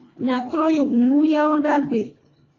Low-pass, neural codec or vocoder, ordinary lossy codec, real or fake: 7.2 kHz; codec, 24 kHz, 1.5 kbps, HILCodec; AAC, 32 kbps; fake